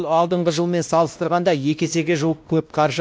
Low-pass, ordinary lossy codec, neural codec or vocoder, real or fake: none; none; codec, 16 kHz, 1 kbps, X-Codec, WavLM features, trained on Multilingual LibriSpeech; fake